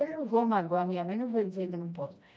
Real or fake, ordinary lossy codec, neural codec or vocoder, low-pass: fake; none; codec, 16 kHz, 1 kbps, FreqCodec, smaller model; none